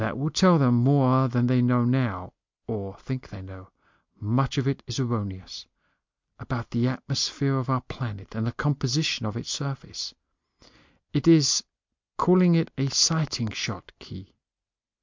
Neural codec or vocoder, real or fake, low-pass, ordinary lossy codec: none; real; 7.2 kHz; MP3, 64 kbps